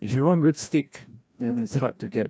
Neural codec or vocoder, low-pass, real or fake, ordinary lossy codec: codec, 16 kHz, 1 kbps, FreqCodec, larger model; none; fake; none